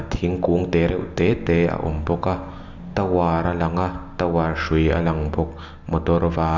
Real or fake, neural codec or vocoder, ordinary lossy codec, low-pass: real; none; Opus, 64 kbps; 7.2 kHz